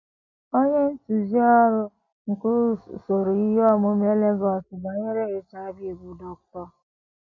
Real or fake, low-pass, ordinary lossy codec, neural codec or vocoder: real; 7.2 kHz; MP3, 32 kbps; none